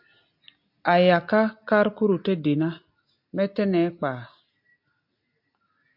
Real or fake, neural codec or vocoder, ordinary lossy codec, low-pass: real; none; MP3, 48 kbps; 5.4 kHz